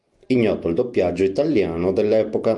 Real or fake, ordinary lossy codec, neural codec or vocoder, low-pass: real; Opus, 32 kbps; none; 10.8 kHz